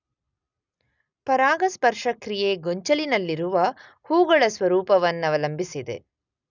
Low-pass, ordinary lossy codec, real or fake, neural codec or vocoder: 7.2 kHz; none; real; none